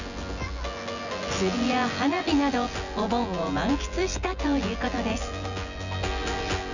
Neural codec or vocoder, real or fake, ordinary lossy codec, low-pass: vocoder, 24 kHz, 100 mel bands, Vocos; fake; none; 7.2 kHz